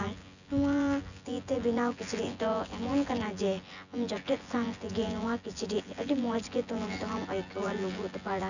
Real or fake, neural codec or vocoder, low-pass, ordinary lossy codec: fake; vocoder, 24 kHz, 100 mel bands, Vocos; 7.2 kHz; none